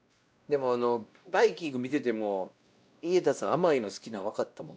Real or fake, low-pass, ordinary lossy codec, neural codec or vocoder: fake; none; none; codec, 16 kHz, 1 kbps, X-Codec, WavLM features, trained on Multilingual LibriSpeech